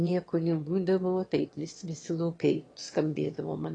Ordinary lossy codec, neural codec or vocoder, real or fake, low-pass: AAC, 32 kbps; autoencoder, 22.05 kHz, a latent of 192 numbers a frame, VITS, trained on one speaker; fake; 9.9 kHz